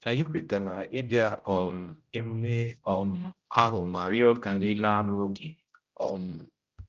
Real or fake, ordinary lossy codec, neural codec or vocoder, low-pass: fake; Opus, 24 kbps; codec, 16 kHz, 0.5 kbps, X-Codec, HuBERT features, trained on general audio; 7.2 kHz